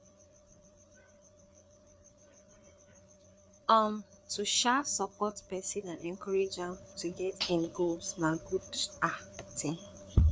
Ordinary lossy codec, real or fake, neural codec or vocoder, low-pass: none; fake; codec, 16 kHz, 4 kbps, FreqCodec, larger model; none